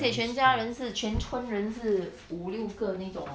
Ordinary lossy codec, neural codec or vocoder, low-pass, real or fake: none; none; none; real